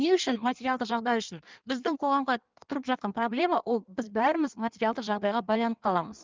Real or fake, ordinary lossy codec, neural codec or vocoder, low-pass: fake; Opus, 32 kbps; codec, 16 kHz in and 24 kHz out, 1.1 kbps, FireRedTTS-2 codec; 7.2 kHz